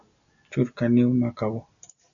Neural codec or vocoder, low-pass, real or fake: none; 7.2 kHz; real